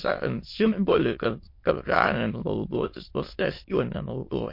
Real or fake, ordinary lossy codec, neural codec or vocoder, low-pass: fake; MP3, 32 kbps; autoencoder, 22.05 kHz, a latent of 192 numbers a frame, VITS, trained on many speakers; 5.4 kHz